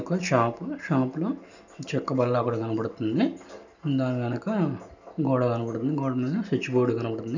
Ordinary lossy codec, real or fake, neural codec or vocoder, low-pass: none; real; none; 7.2 kHz